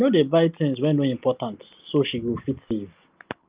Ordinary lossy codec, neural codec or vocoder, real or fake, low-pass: Opus, 24 kbps; none; real; 3.6 kHz